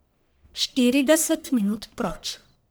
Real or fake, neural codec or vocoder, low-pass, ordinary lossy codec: fake; codec, 44.1 kHz, 1.7 kbps, Pupu-Codec; none; none